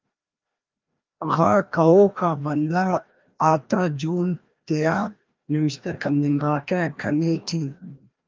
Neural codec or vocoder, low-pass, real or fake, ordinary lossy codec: codec, 16 kHz, 1 kbps, FreqCodec, larger model; 7.2 kHz; fake; Opus, 32 kbps